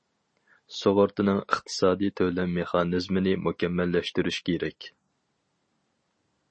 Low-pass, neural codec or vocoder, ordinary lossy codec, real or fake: 9.9 kHz; none; MP3, 32 kbps; real